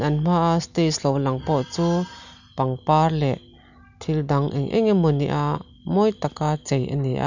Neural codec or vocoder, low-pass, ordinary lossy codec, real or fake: none; 7.2 kHz; none; real